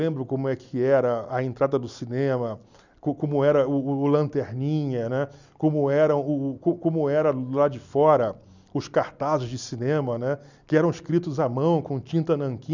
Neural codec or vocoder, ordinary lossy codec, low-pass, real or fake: none; none; 7.2 kHz; real